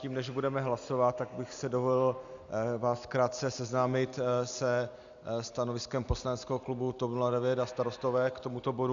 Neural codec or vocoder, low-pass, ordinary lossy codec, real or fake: none; 7.2 kHz; Opus, 64 kbps; real